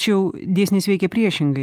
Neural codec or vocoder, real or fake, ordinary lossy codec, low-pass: none; real; Opus, 32 kbps; 14.4 kHz